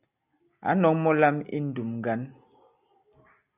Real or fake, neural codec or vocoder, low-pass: real; none; 3.6 kHz